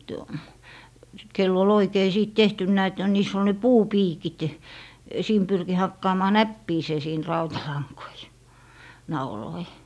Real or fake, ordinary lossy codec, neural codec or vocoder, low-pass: real; none; none; none